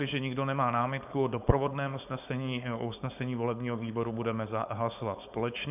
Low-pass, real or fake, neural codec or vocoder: 3.6 kHz; fake; codec, 16 kHz, 4.8 kbps, FACodec